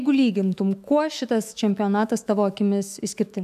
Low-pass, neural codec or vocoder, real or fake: 14.4 kHz; autoencoder, 48 kHz, 128 numbers a frame, DAC-VAE, trained on Japanese speech; fake